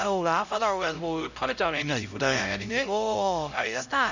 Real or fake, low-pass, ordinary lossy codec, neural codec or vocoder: fake; 7.2 kHz; none; codec, 16 kHz, 0.5 kbps, X-Codec, HuBERT features, trained on LibriSpeech